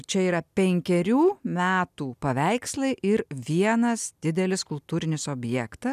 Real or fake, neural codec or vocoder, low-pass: real; none; 14.4 kHz